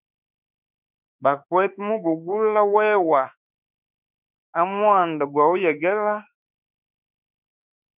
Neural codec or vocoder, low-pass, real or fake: autoencoder, 48 kHz, 32 numbers a frame, DAC-VAE, trained on Japanese speech; 3.6 kHz; fake